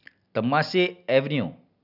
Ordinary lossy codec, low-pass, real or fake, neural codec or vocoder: none; 5.4 kHz; real; none